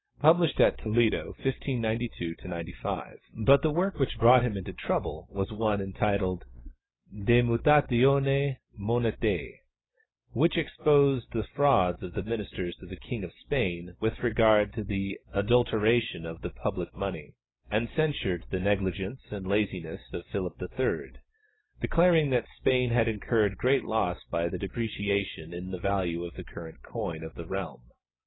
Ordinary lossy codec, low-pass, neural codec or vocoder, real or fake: AAC, 16 kbps; 7.2 kHz; none; real